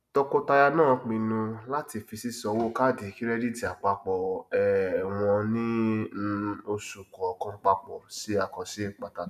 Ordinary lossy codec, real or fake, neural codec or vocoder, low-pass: none; real; none; 14.4 kHz